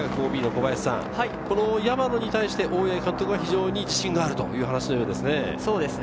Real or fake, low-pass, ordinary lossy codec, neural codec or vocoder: real; none; none; none